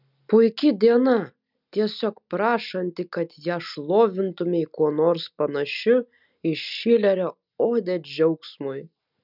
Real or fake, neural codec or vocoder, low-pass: real; none; 5.4 kHz